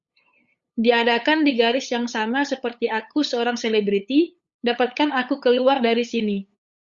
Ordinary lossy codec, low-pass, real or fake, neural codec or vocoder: Opus, 64 kbps; 7.2 kHz; fake; codec, 16 kHz, 8 kbps, FunCodec, trained on LibriTTS, 25 frames a second